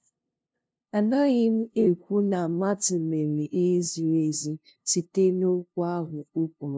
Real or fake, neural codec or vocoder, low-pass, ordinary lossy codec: fake; codec, 16 kHz, 0.5 kbps, FunCodec, trained on LibriTTS, 25 frames a second; none; none